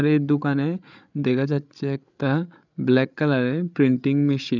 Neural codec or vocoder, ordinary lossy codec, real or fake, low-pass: codec, 16 kHz, 8 kbps, FreqCodec, larger model; none; fake; 7.2 kHz